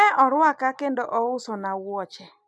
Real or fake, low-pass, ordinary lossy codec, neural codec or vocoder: real; none; none; none